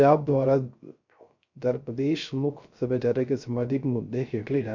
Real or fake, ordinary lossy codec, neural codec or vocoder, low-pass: fake; MP3, 64 kbps; codec, 16 kHz, 0.3 kbps, FocalCodec; 7.2 kHz